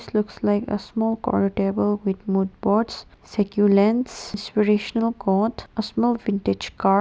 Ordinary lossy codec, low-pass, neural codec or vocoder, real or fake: none; none; none; real